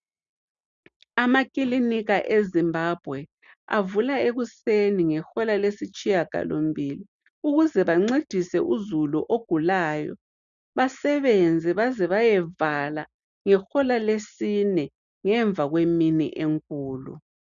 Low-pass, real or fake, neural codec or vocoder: 7.2 kHz; real; none